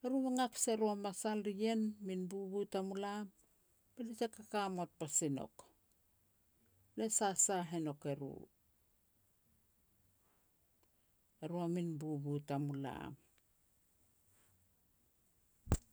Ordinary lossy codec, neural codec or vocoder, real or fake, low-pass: none; none; real; none